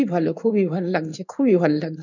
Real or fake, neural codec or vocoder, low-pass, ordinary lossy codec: fake; codec, 16 kHz in and 24 kHz out, 1 kbps, XY-Tokenizer; 7.2 kHz; none